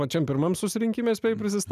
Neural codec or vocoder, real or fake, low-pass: none; real; 14.4 kHz